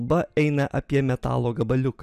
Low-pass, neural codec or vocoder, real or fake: 14.4 kHz; none; real